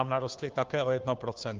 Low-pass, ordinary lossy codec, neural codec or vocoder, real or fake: 7.2 kHz; Opus, 32 kbps; codec, 16 kHz, 2 kbps, FunCodec, trained on Chinese and English, 25 frames a second; fake